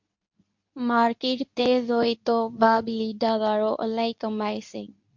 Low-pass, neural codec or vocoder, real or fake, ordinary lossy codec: 7.2 kHz; codec, 24 kHz, 0.9 kbps, WavTokenizer, medium speech release version 2; fake; MP3, 48 kbps